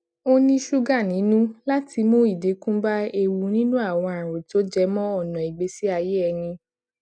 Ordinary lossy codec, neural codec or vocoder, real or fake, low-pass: none; none; real; 9.9 kHz